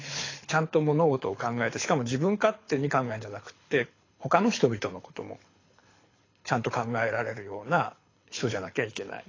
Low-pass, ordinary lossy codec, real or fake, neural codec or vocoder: 7.2 kHz; AAC, 32 kbps; fake; codec, 24 kHz, 6 kbps, HILCodec